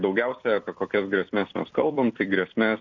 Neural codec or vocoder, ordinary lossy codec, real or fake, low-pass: vocoder, 44.1 kHz, 128 mel bands every 256 samples, BigVGAN v2; MP3, 64 kbps; fake; 7.2 kHz